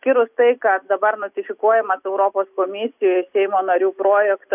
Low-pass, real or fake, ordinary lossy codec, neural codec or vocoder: 3.6 kHz; real; AAC, 32 kbps; none